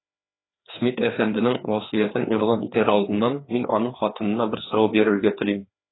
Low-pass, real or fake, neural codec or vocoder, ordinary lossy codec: 7.2 kHz; fake; codec, 16 kHz, 2 kbps, FreqCodec, larger model; AAC, 16 kbps